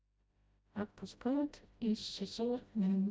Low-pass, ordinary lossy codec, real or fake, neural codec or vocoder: none; none; fake; codec, 16 kHz, 0.5 kbps, FreqCodec, smaller model